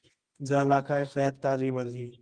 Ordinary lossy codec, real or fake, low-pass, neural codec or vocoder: Opus, 24 kbps; fake; 9.9 kHz; codec, 24 kHz, 0.9 kbps, WavTokenizer, medium music audio release